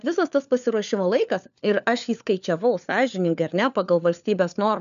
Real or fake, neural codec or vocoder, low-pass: fake; codec, 16 kHz, 4 kbps, FunCodec, trained on LibriTTS, 50 frames a second; 7.2 kHz